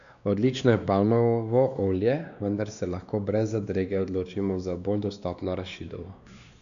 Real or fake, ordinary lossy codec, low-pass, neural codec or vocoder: fake; none; 7.2 kHz; codec, 16 kHz, 2 kbps, X-Codec, WavLM features, trained on Multilingual LibriSpeech